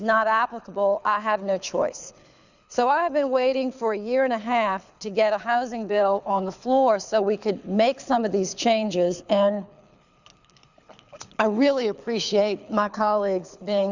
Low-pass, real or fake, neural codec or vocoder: 7.2 kHz; fake; codec, 24 kHz, 6 kbps, HILCodec